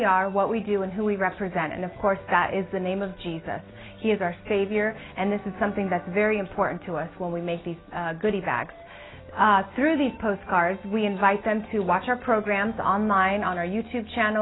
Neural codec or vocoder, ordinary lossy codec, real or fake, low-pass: none; AAC, 16 kbps; real; 7.2 kHz